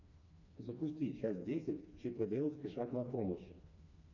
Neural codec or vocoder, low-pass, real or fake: codec, 16 kHz, 2 kbps, FreqCodec, smaller model; 7.2 kHz; fake